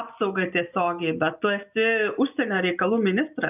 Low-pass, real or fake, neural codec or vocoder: 3.6 kHz; real; none